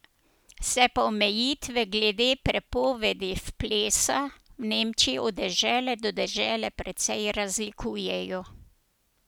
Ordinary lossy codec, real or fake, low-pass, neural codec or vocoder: none; real; none; none